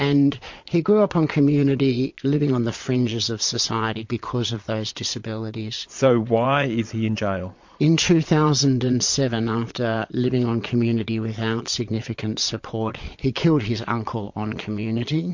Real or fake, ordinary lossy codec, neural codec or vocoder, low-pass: fake; MP3, 48 kbps; vocoder, 22.05 kHz, 80 mel bands, Vocos; 7.2 kHz